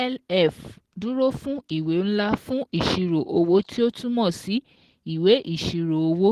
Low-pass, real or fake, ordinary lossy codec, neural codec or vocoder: 14.4 kHz; real; Opus, 16 kbps; none